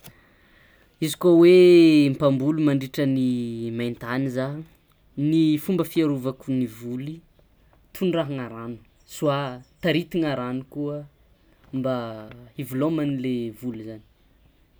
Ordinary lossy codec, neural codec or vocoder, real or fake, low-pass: none; none; real; none